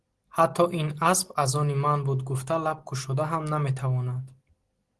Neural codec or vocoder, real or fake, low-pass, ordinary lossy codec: none; real; 10.8 kHz; Opus, 16 kbps